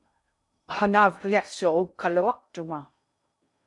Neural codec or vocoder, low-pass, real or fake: codec, 16 kHz in and 24 kHz out, 0.6 kbps, FocalCodec, streaming, 2048 codes; 10.8 kHz; fake